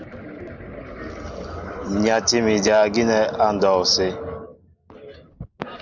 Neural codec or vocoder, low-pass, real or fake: none; 7.2 kHz; real